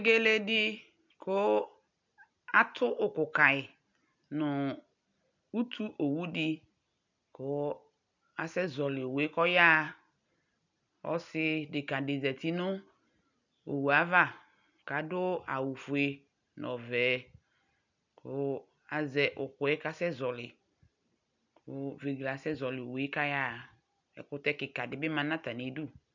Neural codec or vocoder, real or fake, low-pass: none; real; 7.2 kHz